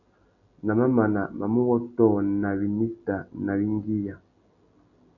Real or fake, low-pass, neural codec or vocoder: real; 7.2 kHz; none